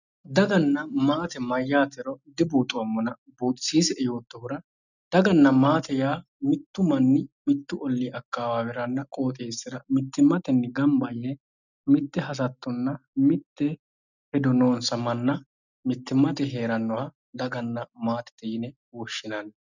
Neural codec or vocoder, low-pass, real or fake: none; 7.2 kHz; real